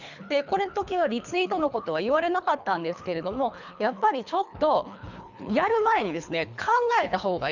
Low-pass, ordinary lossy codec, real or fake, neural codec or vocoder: 7.2 kHz; none; fake; codec, 24 kHz, 3 kbps, HILCodec